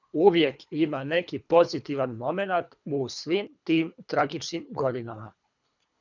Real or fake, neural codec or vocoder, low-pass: fake; codec, 24 kHz, 3 kbps, HILCodec; 7.2 kHz